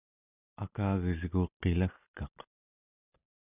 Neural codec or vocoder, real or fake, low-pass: none; real; 3.6 kHz